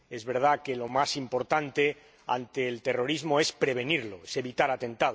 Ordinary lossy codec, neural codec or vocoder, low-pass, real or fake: none; none; none; real